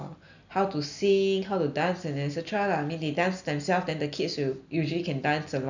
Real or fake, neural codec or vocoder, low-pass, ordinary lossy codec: real; none; 7.2 kHz; none